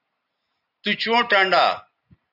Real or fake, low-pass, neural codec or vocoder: real; 5.4 kHz; none